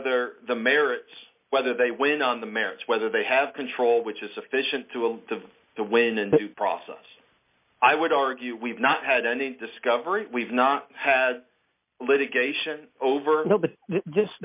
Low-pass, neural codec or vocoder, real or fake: 3.6 kHz; none; real